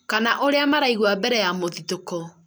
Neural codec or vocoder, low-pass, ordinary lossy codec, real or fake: none; none; none; real